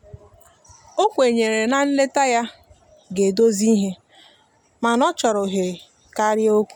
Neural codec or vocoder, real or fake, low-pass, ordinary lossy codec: none; real; 19.8 kHz; none